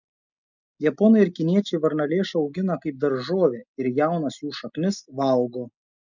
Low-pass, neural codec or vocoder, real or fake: 7.2 kHz; none; real